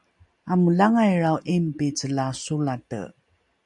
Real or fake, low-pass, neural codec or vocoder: real; 10.8 kHz; none